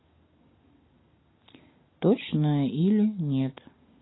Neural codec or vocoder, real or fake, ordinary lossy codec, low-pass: none; real; AAC, 16 kbps; 7.2 kHz